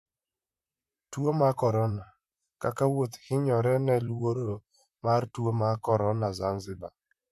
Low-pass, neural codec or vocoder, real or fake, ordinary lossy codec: 14.4 kHz; vocoder, 44.1 kHz, 128 mel bands, Pupu-Vocoder; fake; MP3, 96 kbps